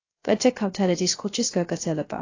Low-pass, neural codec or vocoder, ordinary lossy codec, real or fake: 7.2 kHz; codec, 16 kHz, 0.3 kbps, FocalCodec; AAC, 32 kbps; fake